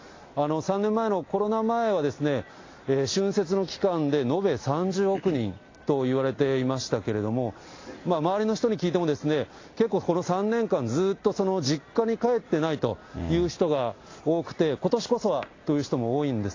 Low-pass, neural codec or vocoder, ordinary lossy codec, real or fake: 7.2 kHz; none; AAC, 32 kbps; real